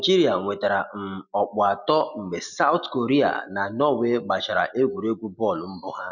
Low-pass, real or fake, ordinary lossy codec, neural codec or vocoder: 7.2 kHz; real; none; none